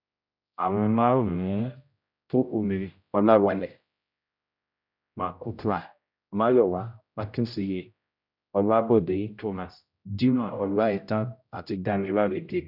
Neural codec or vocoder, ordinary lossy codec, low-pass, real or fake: codec, 16 kHz, 0.5 kbps, X-Codec, HuBERT features, trained on general audio; none; 5.4 kHz; fake